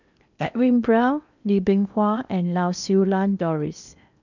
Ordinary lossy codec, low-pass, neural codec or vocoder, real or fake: none; 7.2 kHz; codec, 16 kHz in and 24 kHz out, 0.8 kbps, FocalCodec, streaming, 65536 codes; fake